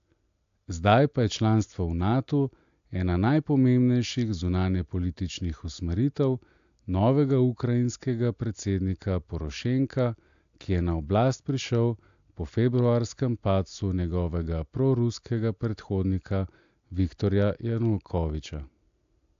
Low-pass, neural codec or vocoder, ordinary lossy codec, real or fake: 7.2 kHz; none; AAC, 64 kbps; real